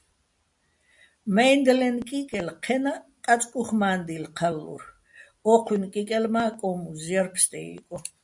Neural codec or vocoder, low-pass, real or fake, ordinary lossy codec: none; 10.8 kHz; real; MP3, 64 kbps